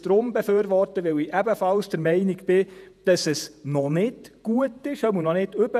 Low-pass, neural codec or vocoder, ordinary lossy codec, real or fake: 14.4 kHz; vocoder, 48 kHz, 128 mel bands, Vocos; none; fake